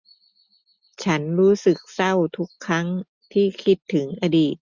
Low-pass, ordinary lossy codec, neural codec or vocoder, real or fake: 7.2 kHz; none; none; real